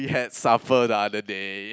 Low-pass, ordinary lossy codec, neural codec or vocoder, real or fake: none; none; none; real